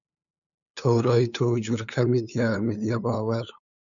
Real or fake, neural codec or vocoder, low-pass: fake; codec, 16 kHz, 8 kbps, FunCodec, trained on LibriTTS, 25 frames a second; 7.2 kHz